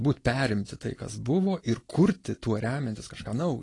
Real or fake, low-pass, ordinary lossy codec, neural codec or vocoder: real; 10.8 kHz; AAC, 32 kbps; none